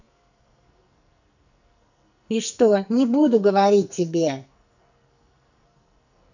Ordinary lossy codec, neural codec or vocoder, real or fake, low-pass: none; codec, 44.1 kHz, 2.6 kbps, SNAC; fake; 7.2 kHz